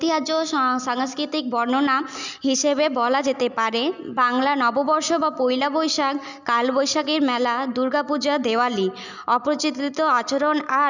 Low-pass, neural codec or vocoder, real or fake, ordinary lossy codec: 7.2 kHz; none; real; none